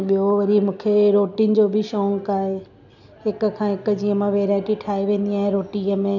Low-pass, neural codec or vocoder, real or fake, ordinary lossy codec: 7.2 kHz; none; real; none